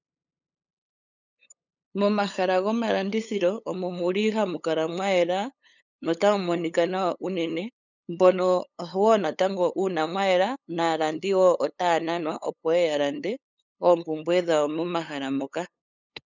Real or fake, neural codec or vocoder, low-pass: fake; codec, 16 kHz, 8 kbps, FunCodec, trained on LibriTTS, 25 frames a second; 7.2 kHz